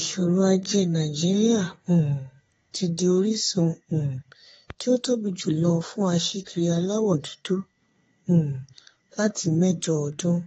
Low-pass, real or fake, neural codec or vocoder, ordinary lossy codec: 14.4 kHz; fake; codec, 32 kHz, 1.9 kbps, SNAC; AAC, 24 kbps